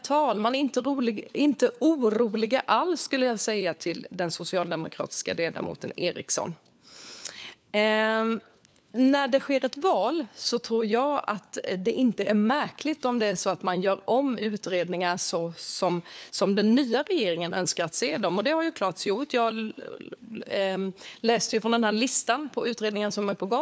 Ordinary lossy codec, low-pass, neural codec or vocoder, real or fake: none; none; codec, 16 kHz, 4 kbps, FunCodec, trained on LibriTTS, 50 frames a second; fake